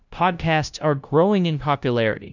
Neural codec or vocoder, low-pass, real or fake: codec, 16 kHz, 0.5 kbps, FunCodec, trained on LibriTTS, 25 frames a second; 7.2 kHz; fake